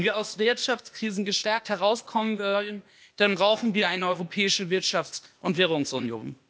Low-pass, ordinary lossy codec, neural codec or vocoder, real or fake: none; none; codec, 16 kHz, 0.8 kbps, ZipCodec; fake